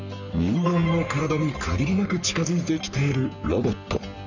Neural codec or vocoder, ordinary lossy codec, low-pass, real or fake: codec, 44.1 kHz, 3.4 kbps, Pupu-Codec; none; 7.2 kHz; fake